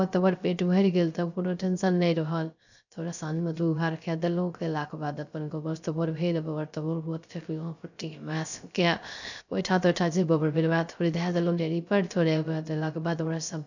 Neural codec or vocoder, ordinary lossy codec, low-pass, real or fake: codec, 16 kHz, 0.3 kbps, FocalCodec; none; 7.2 kHz; fake